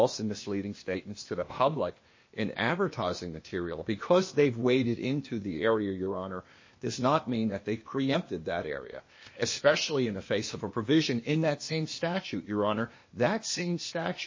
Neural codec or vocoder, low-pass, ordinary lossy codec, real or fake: codec, 16 kHz, 0.8 kbps, ZipCodec; 7.2 kHz; MP3, 32 kbps; fake